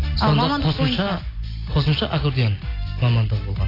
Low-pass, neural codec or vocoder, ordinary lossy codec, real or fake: 5.4 kHz; none; AAC, 24 kbps; real